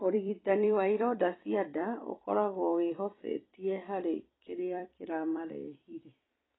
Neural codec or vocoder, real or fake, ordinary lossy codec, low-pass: vocoder, 44.1 kHz, 128 mel bands every 256 samples, BigVGAN v2; fake; AAC, 16 kbps; 7.2 kHz